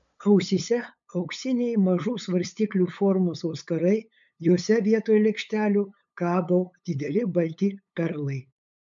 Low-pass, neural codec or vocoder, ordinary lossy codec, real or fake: 7.2 kHz; codec, 16 kHz, 8 kbps, FunCodec, trained on LibriTTS, 25 frames a second; MP3, 64 kbps; fake